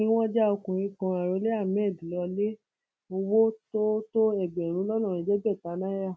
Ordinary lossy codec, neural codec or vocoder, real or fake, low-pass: none; none; real; none